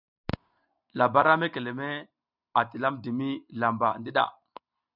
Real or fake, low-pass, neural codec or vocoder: real; 5.4 kHz; none